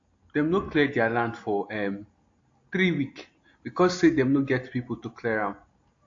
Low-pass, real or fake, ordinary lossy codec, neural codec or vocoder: 7.2 kHz; real; AAC, 48 kbps; none